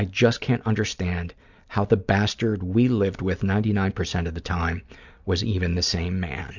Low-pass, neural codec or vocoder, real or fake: 7.2 kHz; none; real